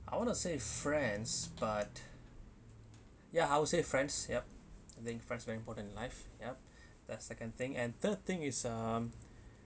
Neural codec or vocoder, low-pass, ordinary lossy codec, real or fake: none; none; none; real